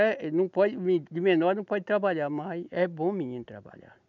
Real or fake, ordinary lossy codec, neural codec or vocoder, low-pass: real; none; none; 7.2 kHz